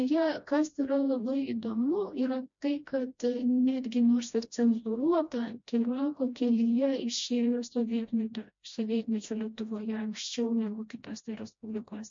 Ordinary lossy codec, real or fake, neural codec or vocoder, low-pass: MP3, 48 kbps; fake; codec, 16 kHz, 1 kbps, FreqCodec, smaller model; 7.2 kHz